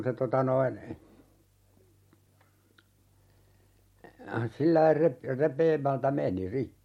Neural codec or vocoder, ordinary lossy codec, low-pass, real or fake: none; MP3, 64 kbps; 19.8 kHz; real